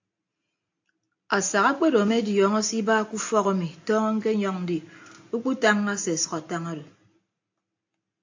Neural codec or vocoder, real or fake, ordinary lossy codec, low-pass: none; real; AAC, 48 kbps; 7.2 kHz